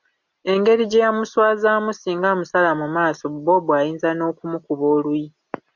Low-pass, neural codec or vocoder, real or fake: 7.2 kHz; none; real